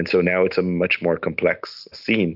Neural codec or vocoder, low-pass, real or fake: none; 5.4 kHz; real